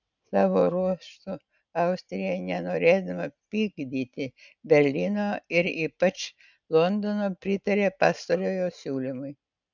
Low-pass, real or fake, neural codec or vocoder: 7.2 kHz; real; none